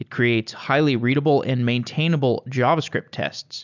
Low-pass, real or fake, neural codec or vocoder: 7.2 kHz; real; none